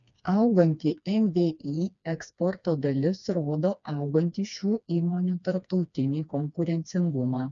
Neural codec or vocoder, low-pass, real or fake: codec, 16 kHz, 2 kbps, FreqCodec, smaller model; 7.2 kHz; fake